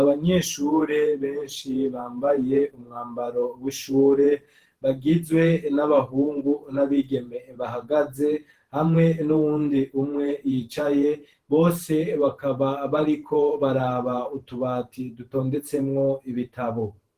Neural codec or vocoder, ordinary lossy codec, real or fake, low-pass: vocoder, 48 kHz, 128 mel bands, Vocos; Opus, 16 kbps; fake; 14.4 kHz